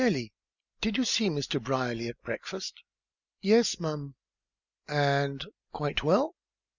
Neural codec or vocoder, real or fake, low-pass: none; real; 7.2 kHz